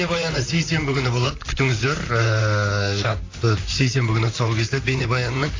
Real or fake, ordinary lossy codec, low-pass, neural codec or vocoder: fake; AAC, 32 kbps; 7.2 kHz; vocoder, 44.1 kHz, 128 mel bands, Pupu-Vocoder